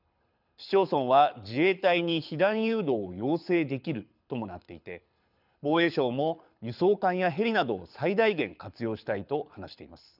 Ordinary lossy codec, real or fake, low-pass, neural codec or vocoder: none; fake; 5.4 kHz; codec, 24 kHz, 6 kbps, HILCodec